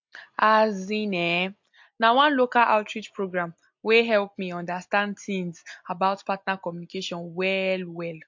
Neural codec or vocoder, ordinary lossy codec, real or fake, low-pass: none; MP3, 48 kbps; real; 7.2 kHz